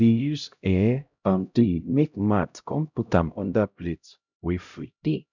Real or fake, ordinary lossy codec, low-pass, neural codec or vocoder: fake; none; 7.2 kHz; codec, 16 kHz, 0.5 kbps, X-Codec, HuBERT features, trained on LibriSpeech